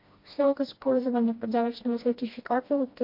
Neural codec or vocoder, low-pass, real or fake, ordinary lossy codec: codec, 16 kHz, 1 kbps, FreqCodec, smaller model; 5.4 kHz; fake; MP3, 32 kbps